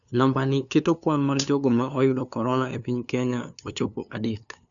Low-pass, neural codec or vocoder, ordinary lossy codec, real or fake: 7.2 kHz; codec, 16 kHz, 2 kbps, FunCodec, trained on LibriTTS, 25 frames a second; none; fake